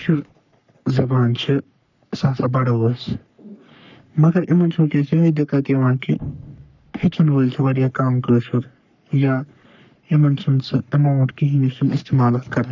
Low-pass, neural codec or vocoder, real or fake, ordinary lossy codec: 7.2 kHz; codec, 44.1 kHz, 3.4 kbps, Pupu-Codec; fake; none